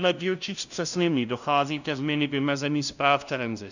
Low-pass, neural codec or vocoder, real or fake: 7.2 kHz; codec, 16 kHz, 0.5 kbps, FunCodec, trained on LibriTTS, 25 frames a second; fake